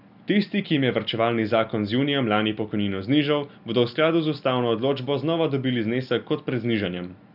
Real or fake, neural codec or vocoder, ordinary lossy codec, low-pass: real; none; none; 5.4 kHz